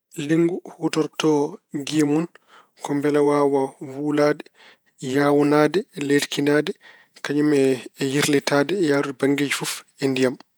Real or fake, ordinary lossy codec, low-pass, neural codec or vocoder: fake; none; none; vocoder, 48 kHz, 128 mel bands, Vocos